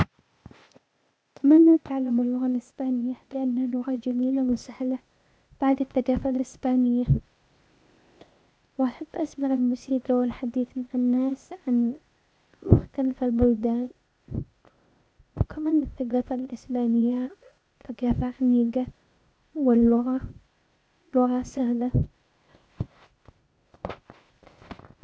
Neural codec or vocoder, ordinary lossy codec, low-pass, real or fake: codec, 16 kHz, 0.8 kbps, ZipCodec; none; none; fake